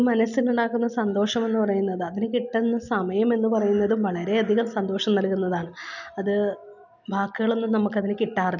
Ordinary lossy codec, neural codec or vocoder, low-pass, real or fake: none; none; 7.2 kHz; real